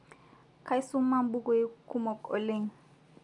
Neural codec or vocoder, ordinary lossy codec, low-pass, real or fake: none; none; 10.8 kHz; real